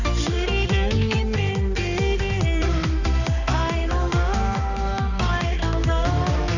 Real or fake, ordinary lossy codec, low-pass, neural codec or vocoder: fake; none; 7.2 kHz; codec, 16 kHz, 2 kbps, X-Codec, HuBERT features, trained on balanced general audio